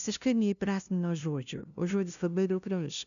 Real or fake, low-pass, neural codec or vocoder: fake; 7.2 kHz; codec, 16 kHz, 0.5 kbps, FunCodec, trained on LibriTTS, 25 frames a second